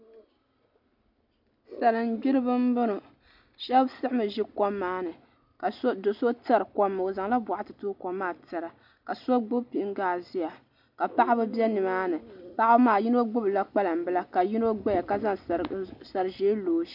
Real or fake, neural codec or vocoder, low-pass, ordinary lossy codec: real; none; 5.4 kHz; MP3, 48 kbps